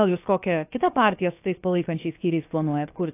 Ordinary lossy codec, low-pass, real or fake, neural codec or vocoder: AAC, 24 kbps; 3.6 kHz; fake; codec, 16 kHz, about 1 kbps, DyCAST, with the encoder's durations